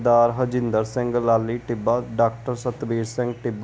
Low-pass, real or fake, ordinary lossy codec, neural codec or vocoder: none; real; none; none